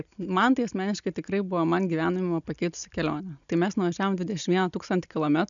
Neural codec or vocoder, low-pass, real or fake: none; 7.2 kHz; real